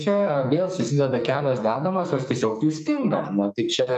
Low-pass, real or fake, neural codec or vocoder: 14.4 kHz; fake; codec, 44.1 kHz, 2.6 kbps, SNAC